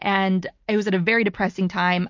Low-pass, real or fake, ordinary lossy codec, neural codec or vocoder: 7.2 kHz; real; MP3, 48 kbps; none